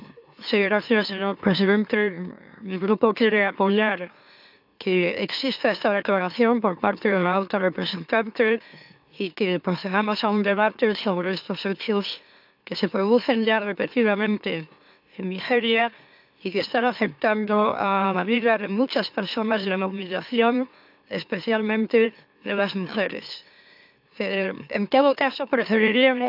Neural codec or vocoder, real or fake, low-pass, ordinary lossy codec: autoencoder, 44.1 kHz, a latent of 192 numbers a frame, MeloTTS; fake; 5.4 kHz; AAC, 48 kbps